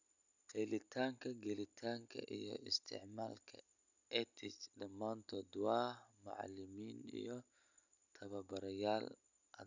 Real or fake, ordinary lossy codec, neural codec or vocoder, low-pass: real; none; none; 7.2 kHz